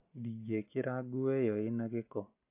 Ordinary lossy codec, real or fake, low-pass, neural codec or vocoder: AAC, 32 kbps; real; 3.6 kHz; none